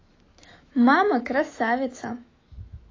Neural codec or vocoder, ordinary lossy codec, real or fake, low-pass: autoencoder, 48 kHz, 128 numbers a frame, DAC-VAE, trained on Japanese speech; AAC, 32 kbps; fake; 7.2 kHz